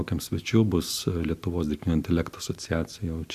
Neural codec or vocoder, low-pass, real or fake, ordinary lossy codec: none; 14.4 kHz; real; Opus, 64 kbps